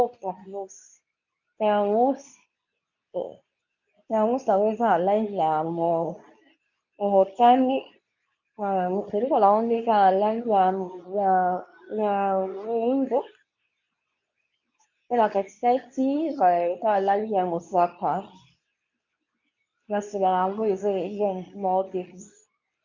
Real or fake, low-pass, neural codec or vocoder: fake; 7.2 kHz; codec, 24 kHz, 0.9 kbps, WavTokenizer, medium speech release version 2